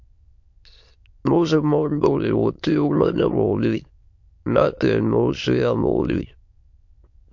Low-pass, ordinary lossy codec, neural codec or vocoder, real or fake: 7.2 kHz; MP3, 48 kbps; autoencoder, 22.05 kHz, a latent of 192 numbers a frame, VITS, trained on many speakers; fake